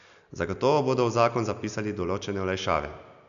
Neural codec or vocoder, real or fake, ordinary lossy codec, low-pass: none; real; none; 7.2 kHz